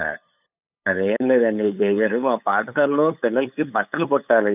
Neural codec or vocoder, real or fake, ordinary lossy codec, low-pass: codec, 16 kHz, 8 kbps, FunCodec, trained on LibriTTS, 25 frames a second; fake; none; 3.6 kHz